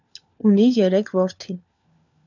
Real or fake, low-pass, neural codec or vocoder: fake; 7.2 kHz; codec, 16 kHz, 4 kbps, FunCodec, trained on LibriTTS, 50 frames a second